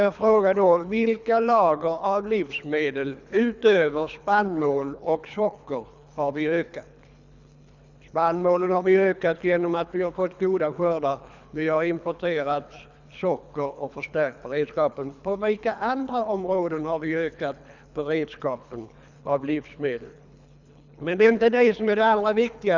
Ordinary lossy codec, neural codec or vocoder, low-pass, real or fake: none; codec, 24 kHz, 3 kbps, HILCodec; 7.2 kHz; fake